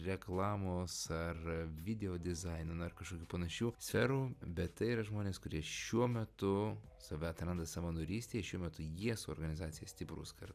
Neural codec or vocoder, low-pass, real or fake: none; 14.4 kHz; real